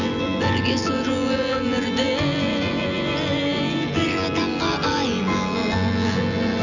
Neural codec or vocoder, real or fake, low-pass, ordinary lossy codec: vocoder, 24 kHz, 100 mel bands, Vocos; fake; 7.2 kHz; none